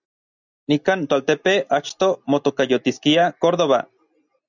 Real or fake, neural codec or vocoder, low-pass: real; none; 7.2 kHz